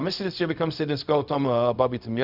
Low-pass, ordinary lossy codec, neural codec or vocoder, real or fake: 5.4 kHz; Opus, 64 kbps; codec, 16 kHz, 0.4 kbps, LongCat-Audio-Codec; fake